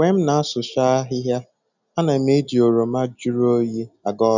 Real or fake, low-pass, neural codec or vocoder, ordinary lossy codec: real; 7.2 kHz; none; none